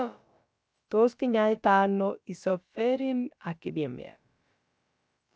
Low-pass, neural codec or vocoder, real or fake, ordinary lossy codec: none; codec, 16 kHz, about 1 kbps, DyCAST, with the encoder's durations; fake; none